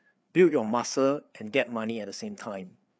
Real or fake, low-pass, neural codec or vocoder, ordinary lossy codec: fake; none; codec, 16 kHz, 4 kbps, FreqCodec, larger model; none